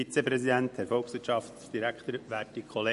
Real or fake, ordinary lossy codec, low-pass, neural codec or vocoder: real; MP3, 48 kbps; 14.4 kHz; none